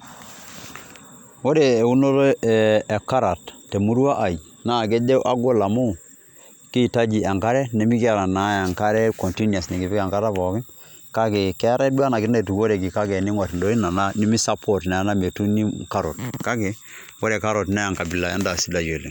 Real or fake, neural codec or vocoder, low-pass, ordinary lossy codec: real; none; 19.8 kHz; none